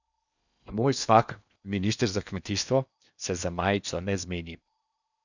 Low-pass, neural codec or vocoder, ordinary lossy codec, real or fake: 7.2 kHz; codec, 16 kHz in and 24 kHz out, 0.8 kbps, FocalCodec, streaming, 65536 codes; none; fake